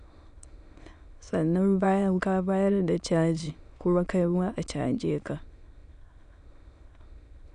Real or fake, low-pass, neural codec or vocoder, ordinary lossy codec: fake; 9.9 kHz; autoencoder, 22.05 kHz, a latent of 192 numbers a frame, VITS, trained on many speakers; none